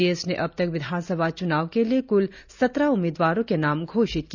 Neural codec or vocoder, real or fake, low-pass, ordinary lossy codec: none; real; 7.2 kHz; none